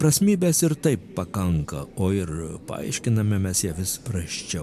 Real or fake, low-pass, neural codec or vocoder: real; 14.4 kHz; none